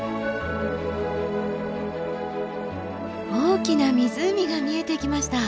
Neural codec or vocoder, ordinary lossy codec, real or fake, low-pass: none; none; real; none